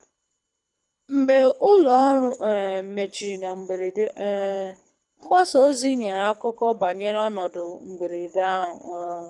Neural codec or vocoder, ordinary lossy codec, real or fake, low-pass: codec, 24 kHz, 3 kbps, HILCodec; none; fake; 10.8 kHz